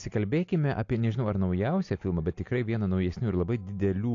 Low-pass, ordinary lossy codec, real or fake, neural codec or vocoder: 7.2 kHz; AAC, 48 kbps; real; none